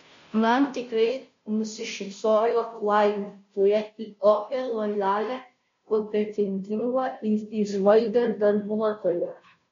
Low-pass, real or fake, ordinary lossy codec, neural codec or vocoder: 7.2 kHz; fake; MP3, 48 kbps; codec, 16 kHz, 0.5 kbps, FunCodec, trained on Chinese and English, 25 frames a second